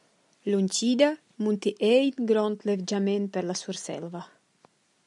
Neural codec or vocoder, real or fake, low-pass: none; real; 10.8 kHz